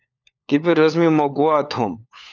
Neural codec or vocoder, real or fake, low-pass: codec, 16 kHz, 4 kbps, FunCodec, trained on LibriTTS, 50 frames a second; fake; 7.2 kHz